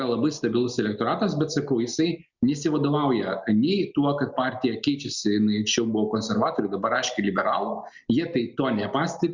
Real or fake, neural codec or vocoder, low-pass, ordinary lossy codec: real; none; 7.2 kHz; Opus, 64 kbps